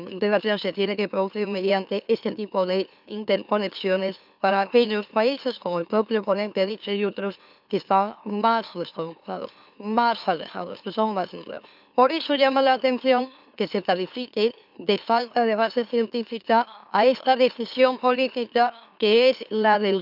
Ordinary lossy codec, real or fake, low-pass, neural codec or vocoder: none; fake; 5.4 kHz; autoencoder, 44.1 kHz, a latent of 192 numbers a frame, MeloTTS